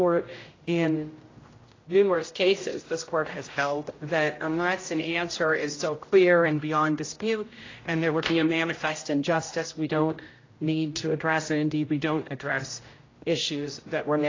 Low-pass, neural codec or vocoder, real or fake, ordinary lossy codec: 7.2 kHz; codec, 16 kHz, 0.5 kbps, X-Codec, HuBERT features, trained on general audio; fake; AAC, 32 kbps